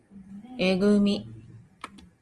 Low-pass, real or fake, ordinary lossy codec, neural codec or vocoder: 10.8 kHz; real; Opus, 24 kbps; none